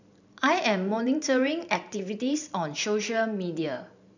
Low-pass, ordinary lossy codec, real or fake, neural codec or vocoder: 7.2 kHz; none; fake; vocoder, 44.1 kHz, 128 mel bands every 512 samples, BigVGAN v2